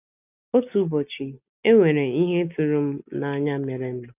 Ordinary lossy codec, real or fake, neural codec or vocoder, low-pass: none; real; none; 3.6 kHz